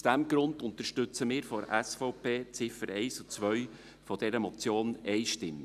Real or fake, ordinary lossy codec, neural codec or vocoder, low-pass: real; none; none; 14.4 kHz